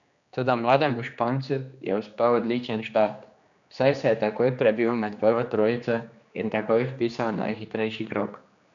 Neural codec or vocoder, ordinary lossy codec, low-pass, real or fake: codec, 16 kHz, 2 kbps, X-Codec, HuBERT features, trained on general audio; none; 7.2 kHz; fake